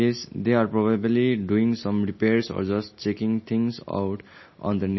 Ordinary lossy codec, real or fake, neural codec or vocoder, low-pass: MP3, 24 kbps; real; none; 7.2 kHz